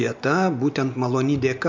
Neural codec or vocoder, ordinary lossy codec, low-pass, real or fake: none; MP3, 64 kbps; 7.2 kHz; real